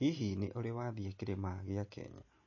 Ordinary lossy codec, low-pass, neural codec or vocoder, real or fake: MP3, 32 kbps; 7.2 kHz; none; real